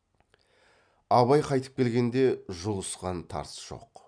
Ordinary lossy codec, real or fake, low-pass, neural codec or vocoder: none; real; 9.9 kHz; none